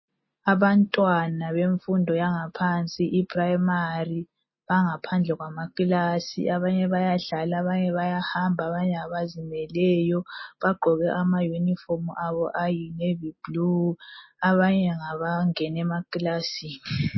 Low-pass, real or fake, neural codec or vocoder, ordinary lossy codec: 7.2 kHz; real; none; MP3, 24 kbps